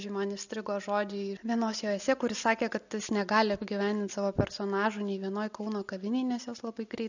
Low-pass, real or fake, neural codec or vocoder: 7.2 kHz; real; none